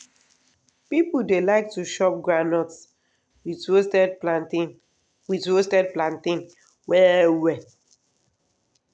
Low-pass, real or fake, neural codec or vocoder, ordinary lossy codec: 9.9 kHz; real; none; none